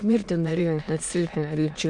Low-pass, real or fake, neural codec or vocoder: 9.9 kHz; fake; autoencoder, 22.05 kHz, a latent of 192 numbers a frame, VITS, trained on many speakers